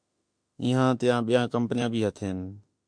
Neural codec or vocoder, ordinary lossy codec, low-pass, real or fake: autoencoder, 48 kHz, 32 numbers a frame, DAC-VAE, trained on Japanese speech; MP3, 64 kbps; 9.9 kHz; fake